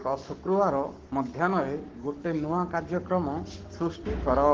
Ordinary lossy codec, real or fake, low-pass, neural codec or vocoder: Opus, 16 kbps; fake; 7.2 kHz; codec, 44.1 kHz, 7.8 kbps, Pupu-Codec